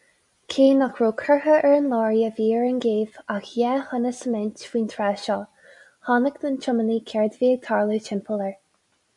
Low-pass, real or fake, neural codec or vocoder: 10.8 kHz; real; none